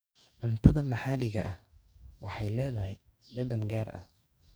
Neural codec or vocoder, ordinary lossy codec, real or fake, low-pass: codec, 44.1 kHz, 2.6 kbps, DAC; none; fake; none